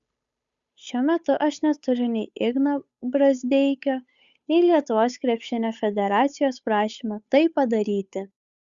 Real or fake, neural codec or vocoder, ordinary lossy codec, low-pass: fake; codec, 16 kHz, 8 kbps, FunCodec, trained on Chinese and English, 25 frames a second; Opus, 64 kbps; 7.2 kHz